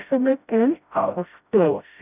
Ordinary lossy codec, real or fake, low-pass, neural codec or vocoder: none; fake; 3.6 kHz; codec, 16 kHz, 0.5 kbps, FreqCodec, smaller model